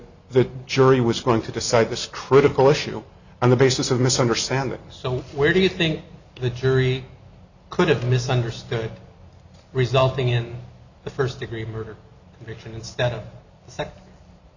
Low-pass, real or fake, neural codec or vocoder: 7.2 kHz; real; none